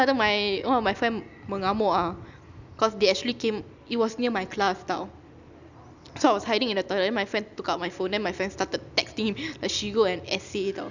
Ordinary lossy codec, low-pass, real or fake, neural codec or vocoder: none; 7.2 kHz; real; none